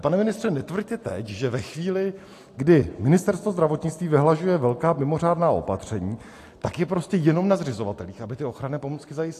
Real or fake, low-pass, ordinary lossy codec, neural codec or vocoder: real; 14.4 kHz; AAC, 64 kbps; none